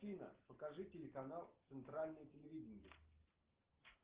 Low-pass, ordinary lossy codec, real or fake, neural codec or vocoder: 3.6 kHz; Opus, 16 kbps; real; none